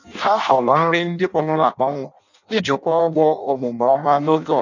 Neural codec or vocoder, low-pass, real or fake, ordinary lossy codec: codec, 16 kHz in and 24 kHz out, 0.6 kbps, FireRedTTS-2 codec; 7.2 kHz; fake; none